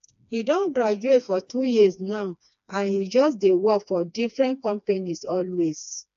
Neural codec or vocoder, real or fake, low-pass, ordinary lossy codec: codec, 16 kHz, 2 kbps, FreqCodec, smaller model; fake; 7.2 kHz; AAC, 96 kbps